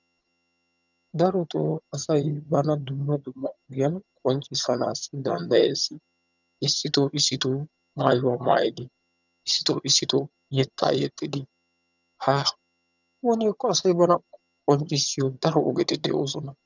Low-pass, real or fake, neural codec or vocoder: 7.2 kHz; fake; vocoder, 22.05 kHz, 80 mel bands, HiFi-GAN